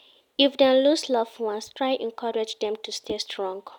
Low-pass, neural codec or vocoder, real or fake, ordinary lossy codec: 19.8 kHz; none; real; none